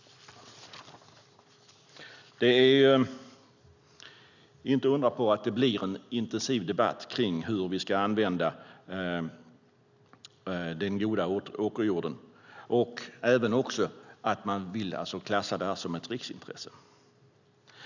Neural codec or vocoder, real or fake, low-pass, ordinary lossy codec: none; real; 7.2 kHz; none